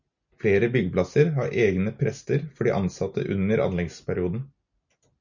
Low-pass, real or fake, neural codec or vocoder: 7.2 kHz; real; none